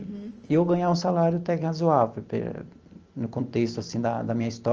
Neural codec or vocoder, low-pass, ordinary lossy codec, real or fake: none; 7.2 kHz; Opus, 24 kbps; real